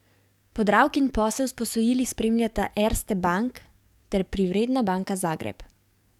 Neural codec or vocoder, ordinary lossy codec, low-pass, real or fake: codec, 44.1 kHz, 7.8 kbps, DAC; none; 19.8 kHz; fake